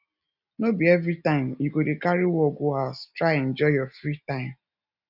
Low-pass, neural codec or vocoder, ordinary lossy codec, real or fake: 5.4 kHz; none; none; real